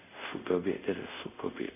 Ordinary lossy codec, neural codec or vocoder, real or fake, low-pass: none; codec, 24 kHz, 0.5 kbps, DualCodec; fake; 3.6 kHz